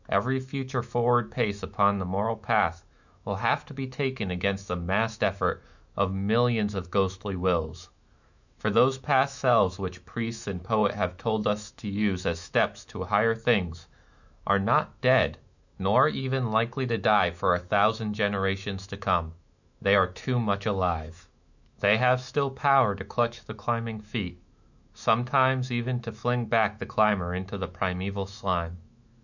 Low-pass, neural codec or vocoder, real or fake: 7.2 kHz; autoencoder, 48 kHz, 128 numbers a frame, DAC-VAE, trained on Japanese speech; fake